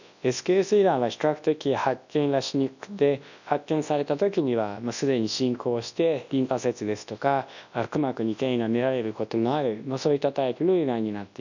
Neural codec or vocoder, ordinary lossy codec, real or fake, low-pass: codec, 24 kHz, 0.9 kbps, WavTokenizer, large speech release; none; fake; 7.2 kHz